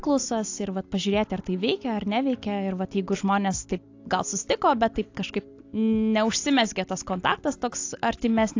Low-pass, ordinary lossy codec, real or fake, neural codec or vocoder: 7.2 kHz; AAC, 48 kbps; real; none